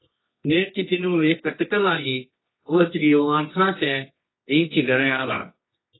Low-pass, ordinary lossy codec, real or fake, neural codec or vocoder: 7.2 kHz; AAC, 16 kbps; fake; codec, 24 kHz, 0.9 kbps, WavTokenizer, medium music audio release